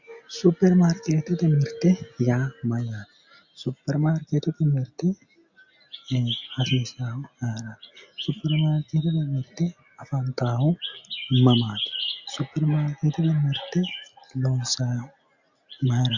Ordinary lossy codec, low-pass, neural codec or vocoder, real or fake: Opus, 64 kbps; 7.2 kHz; none; real